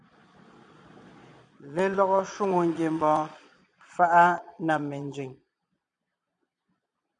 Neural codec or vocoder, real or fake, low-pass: vocoder, 22.05 kHz, 80 mel bands, Vocos; fake; 9.9 kHz